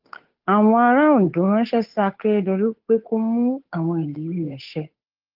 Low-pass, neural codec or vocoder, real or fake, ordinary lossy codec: 5.4 kHz; codec, 16 kHz, 8 kbps, FunCodec, trained on Chinese and English, 25 frames a second; fake; Opus, 32 kbps